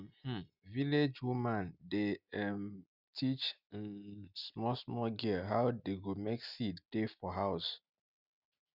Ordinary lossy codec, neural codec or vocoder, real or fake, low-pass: none; none; real; 5.4 kHz